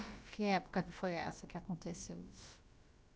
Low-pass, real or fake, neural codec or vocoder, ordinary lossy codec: none; fake; codec, 16 kHz, about 1 kbps, DyCAST, with the encoder's durations; none